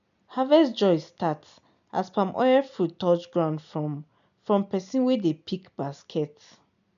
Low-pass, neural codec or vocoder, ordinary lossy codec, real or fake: 7.2 kHz; none; none; real